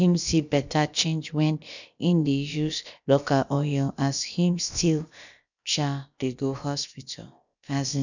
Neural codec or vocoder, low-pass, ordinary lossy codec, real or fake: codec, 16 kHz, about 1 kbps, DyCAST, with the encoder's durations; 7.2 kHz; none; fake